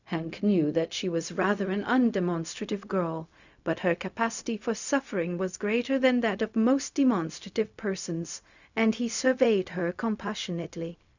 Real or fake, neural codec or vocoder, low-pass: fake; codec, 16 kHz, 0.4 kbps, LongCat-Audio-Codec; 7.2 kHz